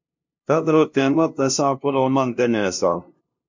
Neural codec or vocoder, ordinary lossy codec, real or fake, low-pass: codec, 16 kHz, 0.5 kbps, FunCodec, trained on LibriTTS, 25 frames a second; MP3, 48 kbps; fake; 7.2 kHz